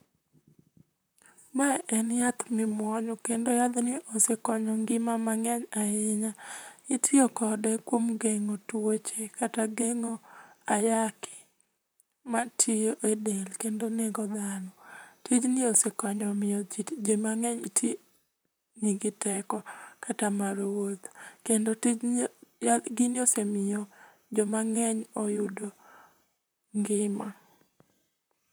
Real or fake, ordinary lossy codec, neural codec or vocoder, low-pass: fake; none; vocoder, 44.1 kHz, 128 mel bands, Pupu-Vocoder; none